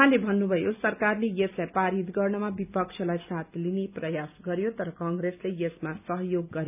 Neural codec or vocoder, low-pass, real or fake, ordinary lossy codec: none; 3.6 kHz; real; none